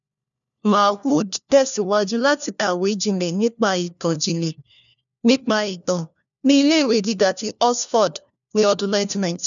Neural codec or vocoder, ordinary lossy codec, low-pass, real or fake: codec, 16 kHz, 1 kbps, FunCodec, trained on LibriTTS, 50 frames a second; none; 7.2 kHz; fake